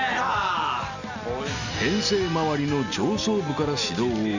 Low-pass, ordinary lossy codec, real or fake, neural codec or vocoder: 7.2 kHz; none; real; none